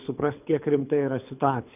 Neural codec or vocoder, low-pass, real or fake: codec, 24 kHz, 6 kbps, HILCodec; 3.6 kHz; fake